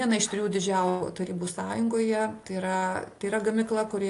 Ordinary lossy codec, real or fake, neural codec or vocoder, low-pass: AAC, 48 kbps; real; none; 10.8 kHz